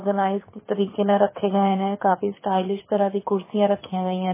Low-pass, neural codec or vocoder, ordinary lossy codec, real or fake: 3.6 kHz; codec, 24 kHz, 6 kbps, HILCodec; MP3, 16 kbps; fake